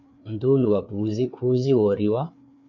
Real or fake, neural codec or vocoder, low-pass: fake; codec, 16 kHz, 4 kbps, FreqCodec, larger model; 7.2 kHz